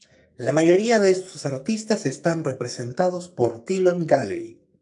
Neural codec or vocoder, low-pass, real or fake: codec, 44.1 kHz, 2.6 kbps, SNAC; 10.8 kHz; fake